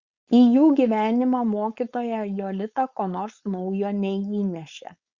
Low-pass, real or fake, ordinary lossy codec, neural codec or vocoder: 7.2 kHz; fake; Opus, 64 kbps; codec, 16 kHz, 4.8 kbps, FACodec